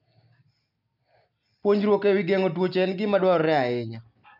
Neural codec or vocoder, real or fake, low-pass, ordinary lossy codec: none; real; 5.4 kHz; none